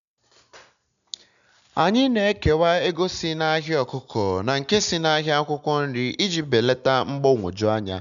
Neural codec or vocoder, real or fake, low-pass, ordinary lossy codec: none; real; 7.2 kHz; none